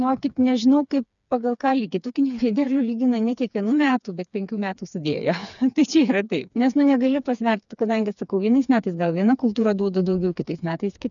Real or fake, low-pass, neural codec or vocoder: fake; 7.2 kHz; codec, 16 kHz, 4 kbps, FreqCodec, smaller model